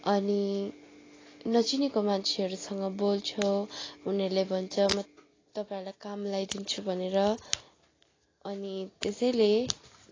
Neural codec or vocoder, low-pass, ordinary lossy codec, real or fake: none; 7.2 kHz; AAC, 32 kbps; real